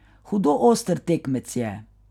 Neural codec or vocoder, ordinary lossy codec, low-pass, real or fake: none; none; 19.8 kHz; real